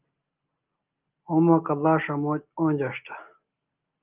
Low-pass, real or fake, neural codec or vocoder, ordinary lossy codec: 3.6 kHz; real; none; Opus, 24 kbps